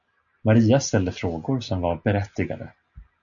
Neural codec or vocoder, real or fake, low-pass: none; real; 7.2 kHz